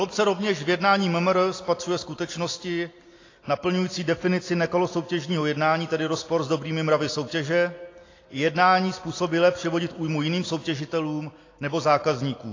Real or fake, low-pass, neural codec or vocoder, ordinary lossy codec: real; 7.2 kHz; none; AAC, 32 kbps